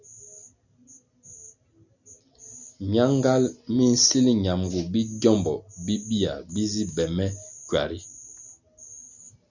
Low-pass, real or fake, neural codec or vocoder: 7.2 kHz; real; none